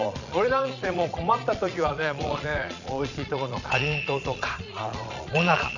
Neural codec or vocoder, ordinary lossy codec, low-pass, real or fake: vocoder, 22.05 kHz, 80 mel bands, Vocos; none; 7.2 kHz; fake